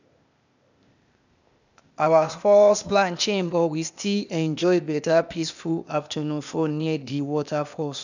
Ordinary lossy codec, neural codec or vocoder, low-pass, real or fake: none; codec, 16 kHz, 0.8 kbps, ZipCodec; 7.2 kHz; fake